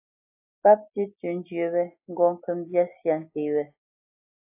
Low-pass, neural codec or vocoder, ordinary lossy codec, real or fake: 3.6 kHz; none; AAC, 24 kbps; real